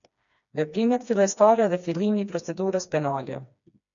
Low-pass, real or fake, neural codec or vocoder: 7.2 kHz; fake; codec, 16 kHz, 2 kbps, FreqCodec, smaller model